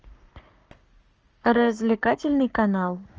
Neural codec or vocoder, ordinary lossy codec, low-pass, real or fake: codec, 44.1 kHz, 7.8 kbps, Pupu-Codec; Opus, 24 kbps; 7.2 kHz; fake